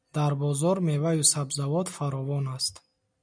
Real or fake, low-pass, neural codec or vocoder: real; 9.9 kHz; none